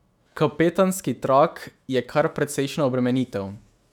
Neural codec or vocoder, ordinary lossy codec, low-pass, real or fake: autoencoder, 48 kHz, 128 numbers a frame, DAC-VAE, trained on Japanese speech; none; 19.8 kHz; fake